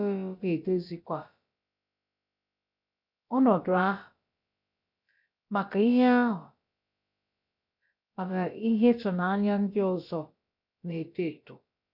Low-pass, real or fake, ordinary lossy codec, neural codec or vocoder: 5.4 kHz; fake; none; codec, 16 kHz, about 1 kbps, DyCAST, with the encoder's durations